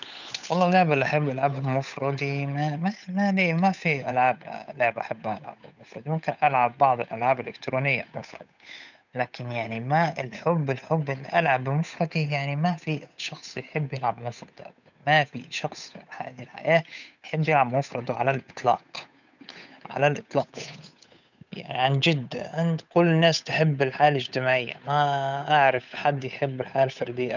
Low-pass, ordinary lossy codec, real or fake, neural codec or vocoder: 7.2 kHz; none; fake; codec, 16 kHz, 8 kbps, FunCodec, trained on Chinese and English, 25 frames a second